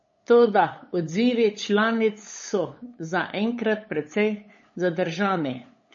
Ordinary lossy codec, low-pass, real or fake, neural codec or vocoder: MP3, 32 kbps; 7.2 kHz; fake; codec, 16 kHz, 8 kbps, FunCodec, trained on LibriTTS, 25 frames a second